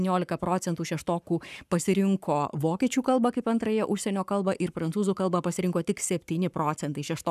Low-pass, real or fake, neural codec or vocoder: 14.4 kHz; fake; codec, 44.1 kHz, 7.8 kbps, Pupu-Codec